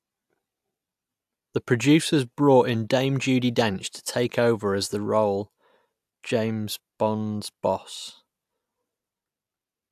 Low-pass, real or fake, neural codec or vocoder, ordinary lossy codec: 14.4 kHz; real; none; AAC, 96 kbps